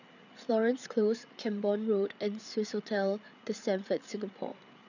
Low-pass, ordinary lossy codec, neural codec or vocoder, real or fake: 7.2 kHz; none; codec, 16 kHz, 8 kbps, FreqCodec, larger model; fake